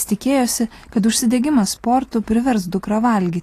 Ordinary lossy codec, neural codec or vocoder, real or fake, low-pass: AAC, 48 kbps; none; real; 14.4 kHz